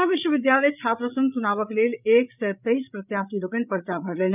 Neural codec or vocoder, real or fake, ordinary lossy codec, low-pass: codec, 16 kHz, 8 kbps, FreqCodec, larger model; fake; none; 3.6 kHz